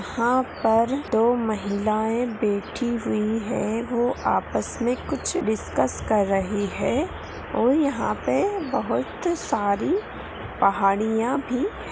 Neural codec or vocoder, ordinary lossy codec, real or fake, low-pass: none; none; real; none